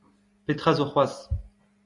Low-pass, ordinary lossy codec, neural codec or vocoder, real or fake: 10.8 kHz; Opus, 64 kbps; none; real